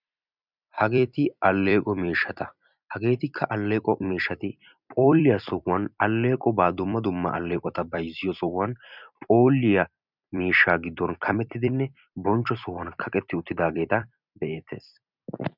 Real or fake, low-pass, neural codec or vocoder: fake; 5.4 kHz; vocoder, 44.1 kHz, 128 mel bands every 256 samples, BigVGAN v2